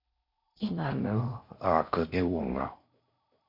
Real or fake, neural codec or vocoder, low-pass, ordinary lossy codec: fake; codec, 16 kHz in and 24 kHz out, 0.6 kbps, FocalCodec, streaming, 4096 codes; 5.4 kHz; MP3, 32 kbps